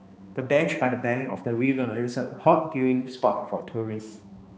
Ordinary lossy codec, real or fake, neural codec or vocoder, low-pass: none; fake; codec, 16 kHz, 2 kbps, X-Codec, HuBERT features, trained on balanced general audio; none